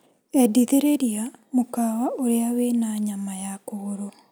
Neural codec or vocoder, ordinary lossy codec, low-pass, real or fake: none; none; none; real